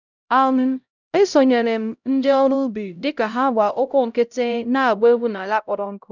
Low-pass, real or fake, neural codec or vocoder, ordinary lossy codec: 7.2 kHz; fake; codec, 16 kHz, 0.5 kbps, X-Codec, HuBERT features, trained on LibriSpeech; none